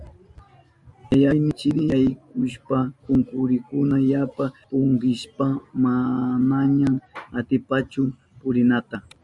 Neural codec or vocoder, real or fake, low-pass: none; real; 10.8 kHz